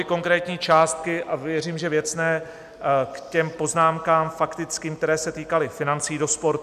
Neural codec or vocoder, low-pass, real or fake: none; 14.4 kHz; real